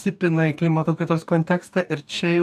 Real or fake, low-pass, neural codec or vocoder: fake; 14.4 kHz; codec, 44.1 kHz, 2.6 kbps, DAC